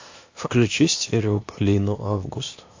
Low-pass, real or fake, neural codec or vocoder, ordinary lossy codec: 7.2 kHz; fake; codec, 16 kHz, 0.8 kbps, ZipCodec; AAC, 48 kbps